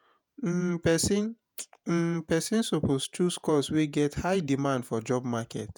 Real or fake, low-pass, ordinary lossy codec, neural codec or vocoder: fake; none; none; vocoder, 48 kHz, 128 mel bands, Vocos